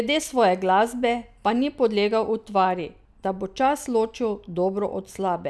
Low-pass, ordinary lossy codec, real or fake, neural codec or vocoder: none; none; real; none